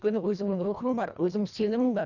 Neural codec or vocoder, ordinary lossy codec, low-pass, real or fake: codec, 24 kHz, 1.5 kbps, HILCodec; none; 7.2 kHz; fake